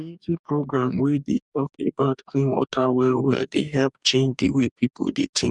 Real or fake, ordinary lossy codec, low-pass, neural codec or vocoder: fake; none; 10.8 kHz; codec, 44.1 kHz, 2.6 kbps, DAC